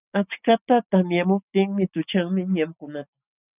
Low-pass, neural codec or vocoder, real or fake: 3.6 kHz; none; real